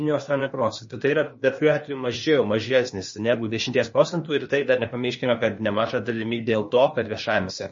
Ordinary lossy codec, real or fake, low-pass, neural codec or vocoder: MP3, 32 kbps; fake; 7.2 kHz; codec, 16 kHz, 0.8 kbps, ZipCodec